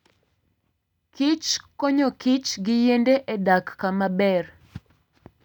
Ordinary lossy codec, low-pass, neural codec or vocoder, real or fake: none; 19.8 kHz; none; real